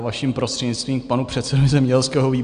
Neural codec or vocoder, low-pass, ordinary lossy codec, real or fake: none; 9.9 kHz; Opus, 64 kbps; real